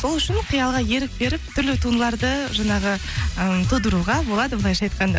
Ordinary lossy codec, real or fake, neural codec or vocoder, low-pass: none; real; none; none